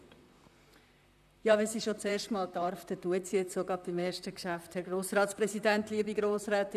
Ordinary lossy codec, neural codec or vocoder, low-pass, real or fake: none; vocoder, 44.1 kHz, 128 mel bands, Pupu-Vocoder; 14.4 kHz; fake